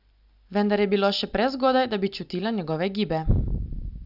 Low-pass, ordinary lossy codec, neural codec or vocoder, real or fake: 5.4 kHz; none; none; real